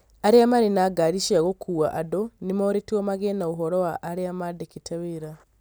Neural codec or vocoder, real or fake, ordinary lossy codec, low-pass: none; real; none; none